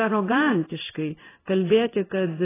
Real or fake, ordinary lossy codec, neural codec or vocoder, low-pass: fake; AAC, 16 kbps; codec, 16 kHz in and 24 kHz out, 1 kbps, XY-Tokenizer; 3.6 kHz